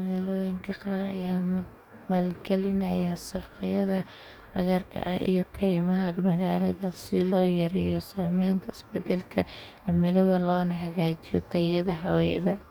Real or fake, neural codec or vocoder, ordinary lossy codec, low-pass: fake; codec, 44.1 kHz, 2.6 kbps, DAC; none; 19.8 kHz